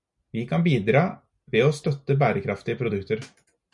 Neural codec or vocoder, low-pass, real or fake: none; 10.8 kHz; real